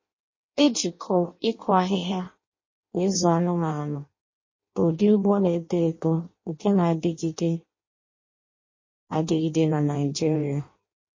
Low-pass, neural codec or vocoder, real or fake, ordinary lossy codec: 7.2 kHz; codec, 16 kHz in and 24 kHz out, 0.6 kbps, FireRedTTS-2 codec; fake; MP3, 32 kbps